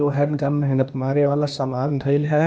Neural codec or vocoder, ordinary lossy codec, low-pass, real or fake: codec, 16 kHz, 0.8 kbps, ZipCodec; none; none; fake